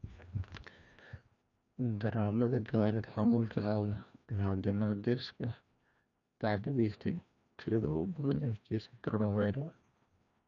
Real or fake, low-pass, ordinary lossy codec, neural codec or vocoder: fake; 7.2 kHz; none; codec, 16 kHz, 1 kbps, FreqCodec, larger model